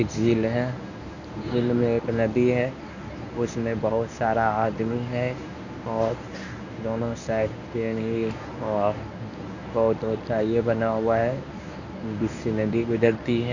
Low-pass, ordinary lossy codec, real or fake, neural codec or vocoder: 7.2 kHz; none; fake; codec, 24 kHz, 0.9 kbps, WavTokenizer, medium speech release version 1